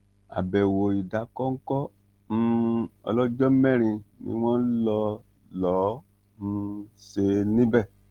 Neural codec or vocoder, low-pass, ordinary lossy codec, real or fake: none; 19.8 kHz; Opus, 16 kbps; real